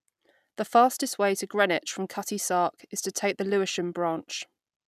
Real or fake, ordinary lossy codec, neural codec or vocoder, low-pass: real; none; none; 14.4 kHz